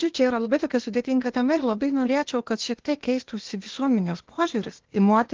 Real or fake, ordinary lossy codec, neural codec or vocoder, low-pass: fake; Opus, 16 kbps; codec, 16 kHz, 0.8 kbps, ZipCodec; 7.2 kHz